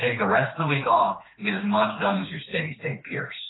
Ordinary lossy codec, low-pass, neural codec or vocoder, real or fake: AAC, 16 kbps; 7.2 kHz; codec, 16 kHz, 2 kbps, FreqCodec, smaller model; fake